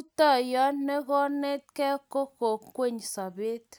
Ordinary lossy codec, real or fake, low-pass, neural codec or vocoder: none; real; none; none